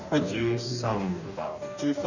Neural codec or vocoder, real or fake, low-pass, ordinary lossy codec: codec, 44.1 kHz, 2.6 kbps, DAC; fake; 7.2 kHz; none